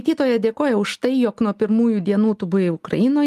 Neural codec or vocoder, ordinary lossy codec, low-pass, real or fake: none; Opus, 32 kbps; 14.4 kHz; real